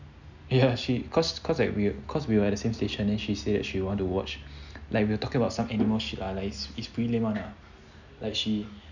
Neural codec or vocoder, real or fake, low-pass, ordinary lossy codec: none; real; 7.2 kHz; none